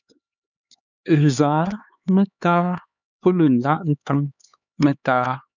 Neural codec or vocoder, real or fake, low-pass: codec, 16 kHz, 4 kbps, X-Codec, HuBERT features, trained on LibriSpeech; fake; 7.2 kHz